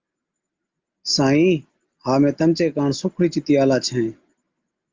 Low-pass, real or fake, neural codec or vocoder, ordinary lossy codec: 7.2 kHz; real; none; Opus, 24 kbps